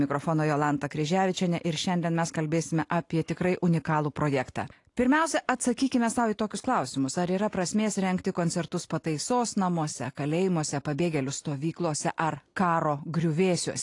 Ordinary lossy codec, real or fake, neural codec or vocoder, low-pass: AAC, 48 kbps; real; none; 10.8 kHz